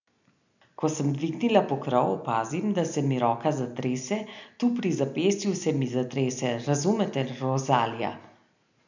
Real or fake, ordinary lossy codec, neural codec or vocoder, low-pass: real; none; none; 7.2 kHz